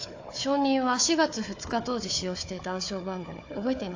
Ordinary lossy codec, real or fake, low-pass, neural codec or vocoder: AAC, 48 kbps; fake; 7.2 kHz; codec, 16 kHz, 4 kbps, FunCodec, trained on Chinese and English, 50 frames a second